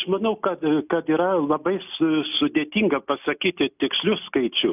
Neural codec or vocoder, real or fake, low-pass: none; real; 3.6 kHz